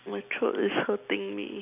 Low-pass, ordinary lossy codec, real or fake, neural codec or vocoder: 3.6 kHz; none; real; none